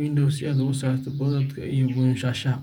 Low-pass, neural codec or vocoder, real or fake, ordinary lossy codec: 19.8 kHz; vocoder, 48 kHz, 128 mel bands, Vocos; fake; none